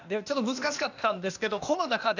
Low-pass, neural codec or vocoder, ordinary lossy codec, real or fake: 7.2 kHz; codec, 16 kHz, 0.8 kbps, ZipCodec; none; fake